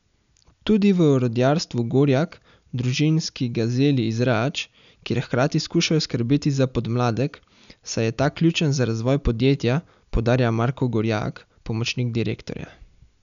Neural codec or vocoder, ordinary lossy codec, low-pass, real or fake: none; none; 7.2 kHz; real